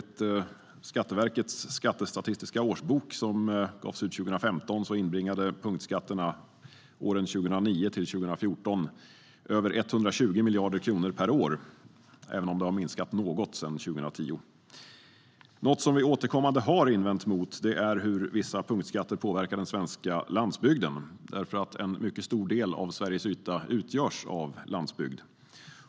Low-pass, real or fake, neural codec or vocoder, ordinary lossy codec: none; real; none; none